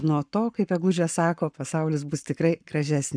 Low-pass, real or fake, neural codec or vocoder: 9.9 kHz; fake; codec, 44.1 kHz, 7.8 kbps, Pupu-Codec